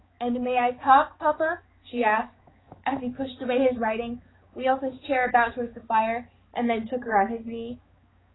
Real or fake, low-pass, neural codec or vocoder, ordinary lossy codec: fake; 7.2 kHz; codec, 16 kHz, 4 kbps, X-Codec, HuBERT features, trained on balanced general audio; AAC, 16 kbps